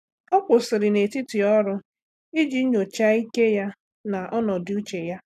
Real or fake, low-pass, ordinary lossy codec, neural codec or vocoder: real; 14.4 kHz; none; none